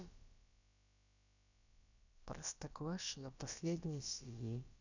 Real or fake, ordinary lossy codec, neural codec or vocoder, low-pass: fake; none; codec, 16 kHz, about 1 kbps, DyCAST, with the encoder's durations; 7.2 kHz